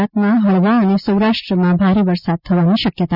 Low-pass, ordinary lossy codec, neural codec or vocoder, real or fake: 5.4 kHz; none; none; real